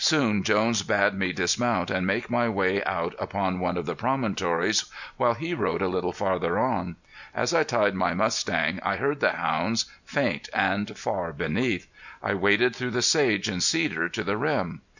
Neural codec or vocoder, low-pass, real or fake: none; 7.2 kHz; real